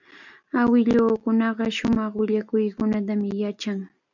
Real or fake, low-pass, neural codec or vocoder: real; 7.2 kHz; none